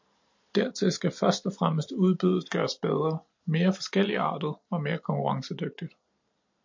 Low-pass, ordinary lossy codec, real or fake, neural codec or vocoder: 7.2 kHz; MP3, 48 kbps; real; none